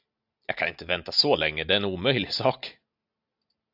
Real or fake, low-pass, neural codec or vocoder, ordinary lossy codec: real; 5.4 kHz; none; AAC, 48 kbps